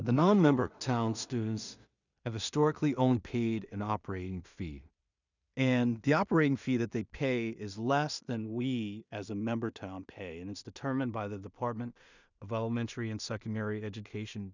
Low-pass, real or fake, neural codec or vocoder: 7.2 kHz; fake; codec, 16 kHz in and 24 kHz out, 0.4 kbps, LongCat-Audio-Codec, two codebook decoder